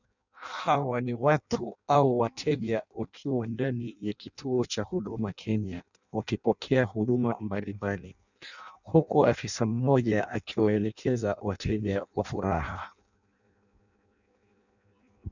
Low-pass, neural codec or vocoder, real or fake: 7.2 kHz; codec, 16 kHz in and 24 kHz out, 0.6 kbps, FireRedTTS-2 codec; fake